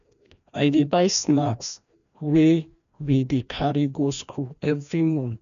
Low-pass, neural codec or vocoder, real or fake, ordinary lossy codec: 7.2 kHz; codec, 16 kHz, 1 kbps, FreqCodec, larger model; fake; none